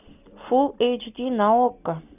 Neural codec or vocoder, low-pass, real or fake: none; 3.6 kHz; real